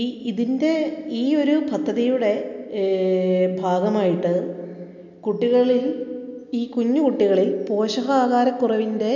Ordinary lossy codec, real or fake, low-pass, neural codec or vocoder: none; real; 7.2 kHz; none